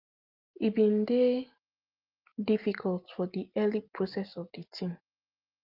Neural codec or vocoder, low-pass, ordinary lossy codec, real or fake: none; 5.4 kHz; Opus, 32 kbps; real